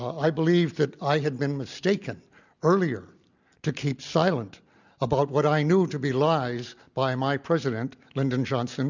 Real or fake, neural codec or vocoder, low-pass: real; none; 7.2 kHz